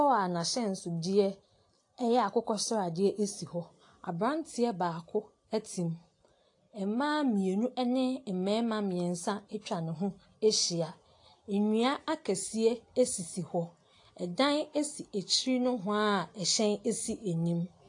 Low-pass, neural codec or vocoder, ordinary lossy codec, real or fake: 10.8 kHz; none; AAC, 48 kbps; real